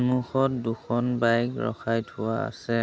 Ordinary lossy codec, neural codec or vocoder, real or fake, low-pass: none; none; real; none